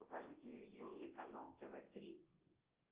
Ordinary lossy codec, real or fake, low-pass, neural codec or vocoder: Opus, 16 kbps; fake; 3.6 kHz; codec, 24 kHz, 0.9 kbps, WavTokenizer, large speech release